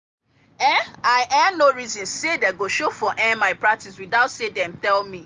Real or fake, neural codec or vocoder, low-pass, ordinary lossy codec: real; none; 7.2 kHz; Opus, 32 kbps